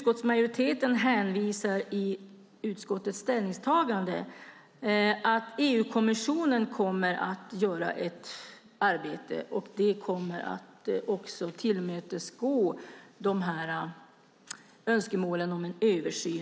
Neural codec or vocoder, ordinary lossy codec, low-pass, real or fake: none; none; none; real